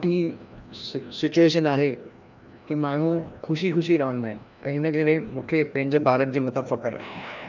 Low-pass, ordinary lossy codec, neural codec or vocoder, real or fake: 7.2 kHz; none; codec, 16 kHz, 1 kbps, FreqCodec, larger model; fake